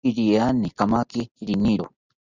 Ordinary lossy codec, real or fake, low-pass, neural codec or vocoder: Opus, 64 kbps; real; 7.2 kHz; none